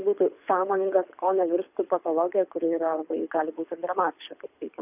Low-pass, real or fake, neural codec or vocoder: 3.6 kHz; fake; codec, 24 kHz, 6 kbps, HILCodec